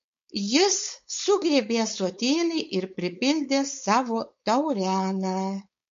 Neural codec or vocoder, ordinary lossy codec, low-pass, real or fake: codec, 16 kHz, 4.8 kbps, FACodec; MP3, 48 kbps; 7.2 kHz; fake